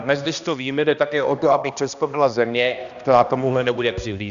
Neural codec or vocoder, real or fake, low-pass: codec, 16 kHz, 1 kbps, X-Codec, HuBERT features, trained on balanced general audio; fake; 7.2 kHz